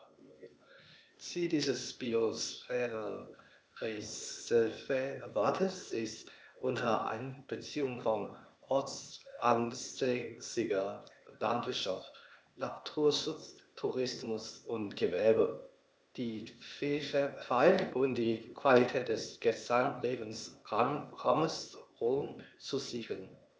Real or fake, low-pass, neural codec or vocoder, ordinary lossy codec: fake; none; codec, 16 kHz, 0.8 kbps, ZipCodec; none